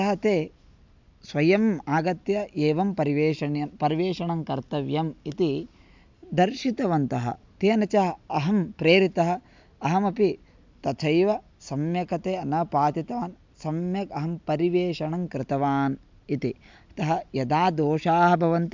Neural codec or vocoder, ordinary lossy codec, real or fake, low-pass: none; MP3, 64 kbps; real; 7.2 kHz